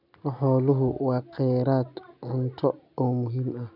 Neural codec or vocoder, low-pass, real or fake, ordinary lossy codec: none; 5.4 kHz; real; none